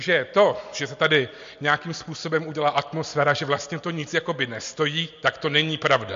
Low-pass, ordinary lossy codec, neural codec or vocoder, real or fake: 7.2 kHz; MP3, 48 kbps; none; real